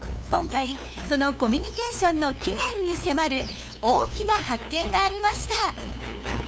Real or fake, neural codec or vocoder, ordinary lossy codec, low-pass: fake; codec, 16 kHz, 2 kbps, FunCodec, trained on LibriTTS, 25 frames a second; none; none